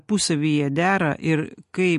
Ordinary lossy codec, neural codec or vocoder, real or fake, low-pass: MP3, 48 kbps; none; real; 14.4 kHz